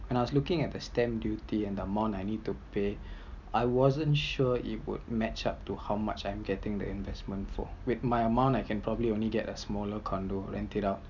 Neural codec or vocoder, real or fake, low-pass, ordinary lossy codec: none; real; 7.2 kHz; none